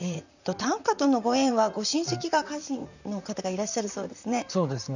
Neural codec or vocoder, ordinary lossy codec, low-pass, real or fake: vocoder, 44.1 kHz, 128 mel bands, Pupu-Vocoder; none; 7.2 kHz; fake